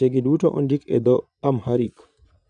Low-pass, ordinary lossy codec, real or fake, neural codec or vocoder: 9.9 kHz; none; fake; vocoder, 22.05 kHz, 80 mel bands, Vocos